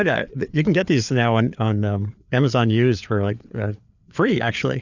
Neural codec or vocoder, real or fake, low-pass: codec, 16 kHz, 4 kbps, FreqCodec, larger model; fake; 7.2 kHz